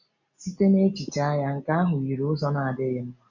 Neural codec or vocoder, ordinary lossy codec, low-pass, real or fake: none; none; 7.2 kHz; real